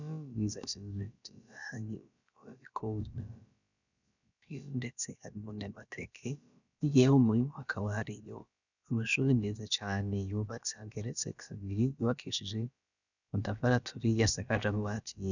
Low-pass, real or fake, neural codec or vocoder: 7.2 kHz; fake; codec, 16 kHz, about 1 kbps, DyCAST, with the encoder's durations